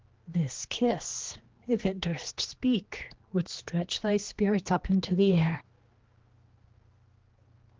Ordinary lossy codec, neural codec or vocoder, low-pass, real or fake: Opus, 16 kbps; codec, 16 kHz, 2 kbps, X-Codec, HuBERT features, trained on general audio; 7.2 kHz; fake